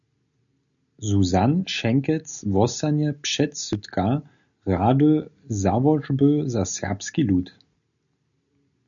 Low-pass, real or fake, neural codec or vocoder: 7.2 kHz; real; none